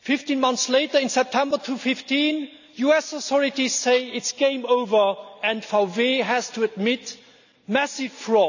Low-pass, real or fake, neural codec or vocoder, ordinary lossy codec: 7.2 kHz; real; none; none